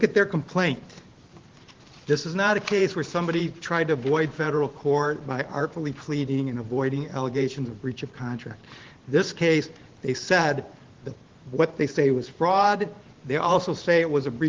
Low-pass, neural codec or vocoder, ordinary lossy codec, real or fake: 7.2 kHz; vocoder, 44.1 kHz, 128 mel bands every 512 samples, BigVGAN v2; Opus, 16 kbps; fake